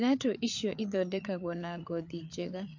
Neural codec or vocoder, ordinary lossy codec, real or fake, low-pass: codec, 16 kHz, 8 kbps, FreqCodec, larger model; MP3, 48 kbps; fake; 7.2 kHz